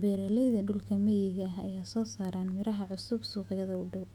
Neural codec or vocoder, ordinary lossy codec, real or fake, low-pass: autoencoder, 48 kHz, 128 numbers a frame, DAC-VAE, trained on Japanese speech; none; fake; 19.8 kHz